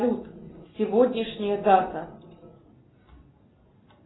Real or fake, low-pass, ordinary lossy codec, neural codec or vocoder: real; 7.2 kHz; AAC, 16 kbps; none